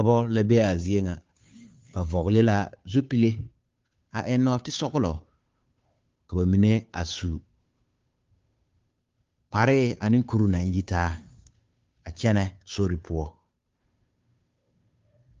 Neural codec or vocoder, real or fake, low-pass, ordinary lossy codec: codec, 16 kHz, 2 kbps, FunCodec, trained on Chinese and English, 25 frames a second; fake; 7.2 kHz; Opus, 32 kbps